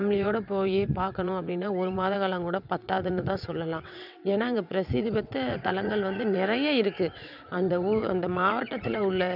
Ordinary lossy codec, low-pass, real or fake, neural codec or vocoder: none; 5.4 kHz; fake; vocoder, 22.05 kHz, 80 mel bands, WaveNeXt